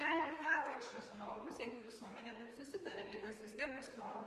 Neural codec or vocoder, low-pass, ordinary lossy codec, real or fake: codec, 24 kHz, 1 kbps, SNAC; 10.8 kHz; Opus, 32 kbps; fake